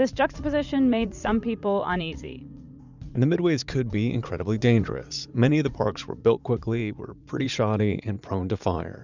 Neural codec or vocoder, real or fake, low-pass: vocoder, 44.1 kHz, 80 mel bands, Vocos; fake; 7.2 kHz